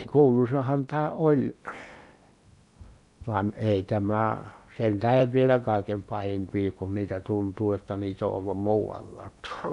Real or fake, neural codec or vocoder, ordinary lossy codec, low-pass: fake; codec, 16 kHz in and 24 kHz out, 0.8 kbps, FocalCodec, streaming, 65536 codes; none; 10.8 kHz